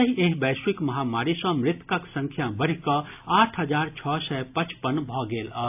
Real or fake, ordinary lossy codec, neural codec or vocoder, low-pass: real; AAC, 32 kbps; none; 3.6 kHz